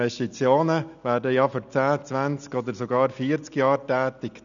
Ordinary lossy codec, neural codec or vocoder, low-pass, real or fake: none; none; 7.2 kHz; real